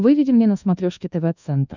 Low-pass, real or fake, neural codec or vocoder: 7.2 kHz; fake; codec, 24 kHz, 1.2 kbps, DualCodec